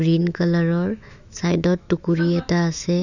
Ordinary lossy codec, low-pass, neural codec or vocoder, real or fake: none; 7.2 kHz; autoencoder, 48 kHz, 128 numbers a frame, DAC-VAE, trained on Japanese speech; fake